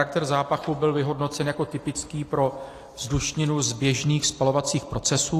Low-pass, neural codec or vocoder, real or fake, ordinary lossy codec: 14.4 kHz; none; real; AAC, 48 kbps